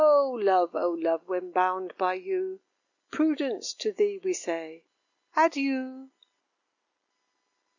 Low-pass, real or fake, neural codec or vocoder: 7.2 kHz; real; none